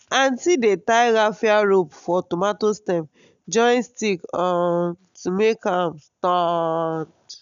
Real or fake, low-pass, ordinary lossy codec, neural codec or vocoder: real; 7.2 kHz; none; none